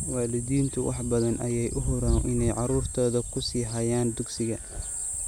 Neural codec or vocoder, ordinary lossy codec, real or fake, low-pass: none; none; real; none